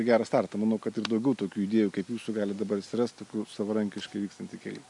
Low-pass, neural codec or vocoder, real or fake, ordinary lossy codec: 10.8 kHz; none; real; MP3, 64 kbps